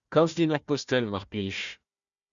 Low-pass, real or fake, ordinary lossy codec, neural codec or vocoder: 7.2 kHz; fake; Opus, 64 kbps; codec, 16 kHz, 1 kbps, FunCodec, trained on Chinese and English, 50 frames a second